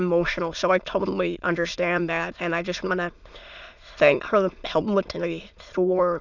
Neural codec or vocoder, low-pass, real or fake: autoencoder, 22.05 kHz, a latent of 192 numbers a frame, VITS, trained on many speakers; 7.2 kHz; fake